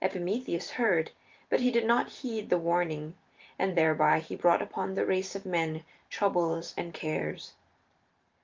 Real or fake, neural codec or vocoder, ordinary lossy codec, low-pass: fake; vocoder, 44.1 kHz, 128 mel bands every 512 samples, BigVGAN v2; Opus, 32 kbps; 7.2 kHz